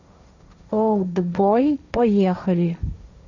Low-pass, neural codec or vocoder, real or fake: 7.2 kHz; codec, 16 kHz, 1.1 kbps, Voila-Tokenizer; fake